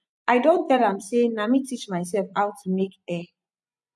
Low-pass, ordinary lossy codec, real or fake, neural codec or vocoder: none; none; fake; vocoder, 24 kHz, 100 mel bands, Vocos